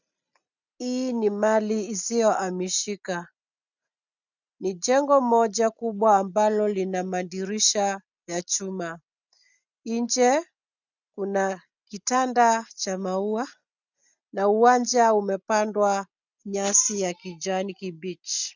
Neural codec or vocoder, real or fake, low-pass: none; real; 7.2 kHz